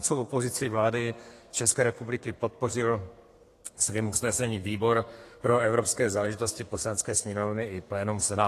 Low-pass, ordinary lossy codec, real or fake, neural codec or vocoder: 14.4 kHz; AAC, 48 kbps; fake; codec, 32 kHz, 1.9 kbps, SNAC